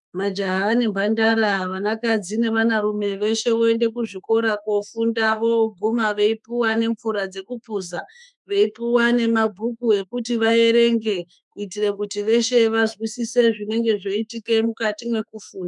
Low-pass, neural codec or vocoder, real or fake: 10.8 kHz; autoencoder, 48 kHz, 32 numbers a frame, DAC-VAE, trained on Japanese speech; fake